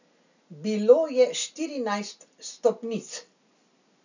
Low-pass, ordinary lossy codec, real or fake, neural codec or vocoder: 7.2 kHz; none; real; none